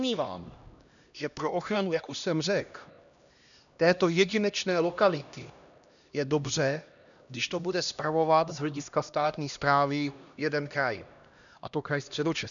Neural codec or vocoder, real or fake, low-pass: codec, 16 kHz, 1 kbps, X-Codec, HuBERT features, trained on LibriSpeech; fake; 7.2 kHz